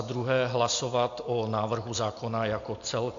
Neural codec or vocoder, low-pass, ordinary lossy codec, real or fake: none; 7.2 kHz; AAC, 48 kbps; real